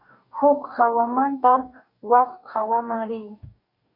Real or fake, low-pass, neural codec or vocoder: fake; 5.4 kHz; codec, 44.1 kHz, 2.6 kbps, DAC